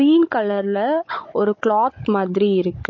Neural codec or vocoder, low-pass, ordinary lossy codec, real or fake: codec, 16 kHz, 16 kbps, FunCodec, trained on Chinese and English, 50 frames a second; 7.2 kHz; MP3, 32 kbps; fake